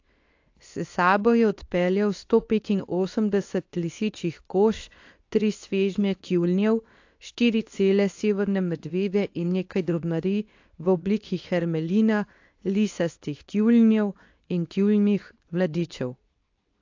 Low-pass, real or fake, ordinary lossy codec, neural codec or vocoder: 7.2 kHz; fake; AAC, 48 kbps; codec, 24 kHz, 0.9 kbps, WavTokenizer, medium speech release version 2